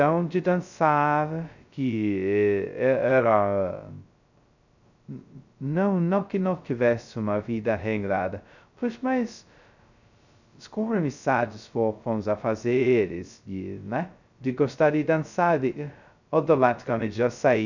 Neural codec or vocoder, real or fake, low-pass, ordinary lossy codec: codec, 16 kHz, 0.2 kbps, FocalCodec; fake; 7.2 kHz; none